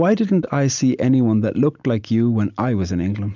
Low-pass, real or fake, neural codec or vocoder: 7.2 kHz; real; none